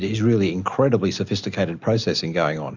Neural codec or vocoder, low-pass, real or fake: none; 7.2 kHz; real